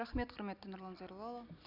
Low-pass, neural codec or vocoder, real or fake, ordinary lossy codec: 5.4 kHz; none; real; none